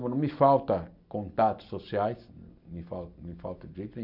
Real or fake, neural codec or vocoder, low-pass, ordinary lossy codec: real; none; 5.4 kHz; none